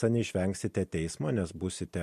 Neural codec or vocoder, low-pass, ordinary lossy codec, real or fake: none; 14.4 kHz; MP3, 64 kbps; real